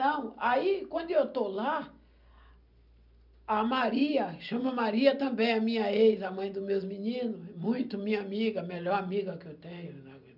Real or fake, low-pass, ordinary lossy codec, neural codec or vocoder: real; 5.4 kHz; none; none